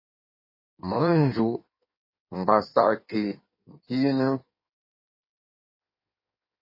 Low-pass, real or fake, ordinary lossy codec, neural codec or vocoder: 5.4 kHz; fake; MP3, 24 kbps; codec, 16 kHz in and 24 kHz out, 1.1 kbps, FireRedTTS-2 codec